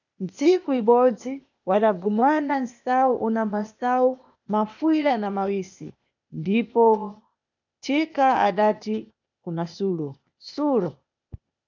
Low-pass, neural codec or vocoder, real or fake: 7.2 kHz; codec, 16 kHz, 0.8 kbps, ZipCodec; fake